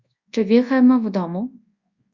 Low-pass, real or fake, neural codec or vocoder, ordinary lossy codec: 7.2 kHz; fake; codec, 24 kHz, 0.9 kbps, WavTokenizer, large speech release; Opus, 64 kbps